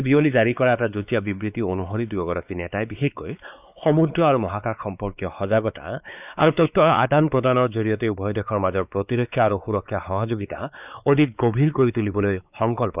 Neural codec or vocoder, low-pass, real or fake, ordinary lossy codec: codec, 16 kHz, 4 kbps, X-Codec, WavLM features, trained on Multilingual LibriSpeech; 3.6 kHz; fake; none